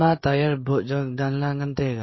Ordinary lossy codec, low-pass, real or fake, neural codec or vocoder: MP3, 24 kbps; 7.2 kHz; fake; codec, 16 kHz, 16 kbps, FreqCodec, smaller model